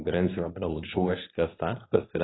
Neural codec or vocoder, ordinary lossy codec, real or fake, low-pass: codec, 24 kHz, 0.9 kbps, WavTokenizer, medium speech release version 2; AAC, 16 kbps; fake; 7.2 kHz